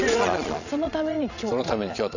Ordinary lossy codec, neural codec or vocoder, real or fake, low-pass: none; vocoder, 22.05 kHz, 80 mel bands, Vocos; fake; 7.2 kHz